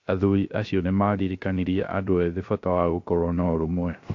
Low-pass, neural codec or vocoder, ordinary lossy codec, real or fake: 7.2 kHz; codec, 16 kHz, 0.3 kbps, FocalCodec; AAC, 48 kbps; fake